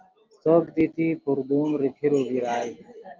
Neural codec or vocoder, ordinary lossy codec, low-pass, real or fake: none; Opus, 24 kbps; 7.2 kHz; real